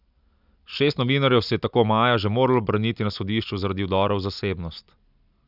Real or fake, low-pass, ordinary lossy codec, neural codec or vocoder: real; 5.4 kHz; none; none